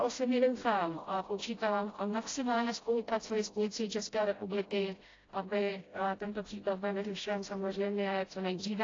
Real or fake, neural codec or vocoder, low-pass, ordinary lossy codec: fake; codec, 16 kHz, 0.5 kbps, FreqCodec, smaller model; 7.2 kHz; AAC, 32 kbps